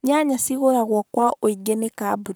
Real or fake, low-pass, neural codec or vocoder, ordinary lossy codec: fake; none; codec, 44.1 kHz, 7.8 kbps, Pupu-Codec; none